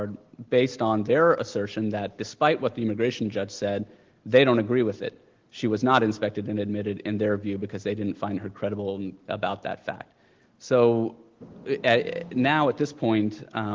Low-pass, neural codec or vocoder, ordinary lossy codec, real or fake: 7.2 kHz; none; Opus, 16 kbps; real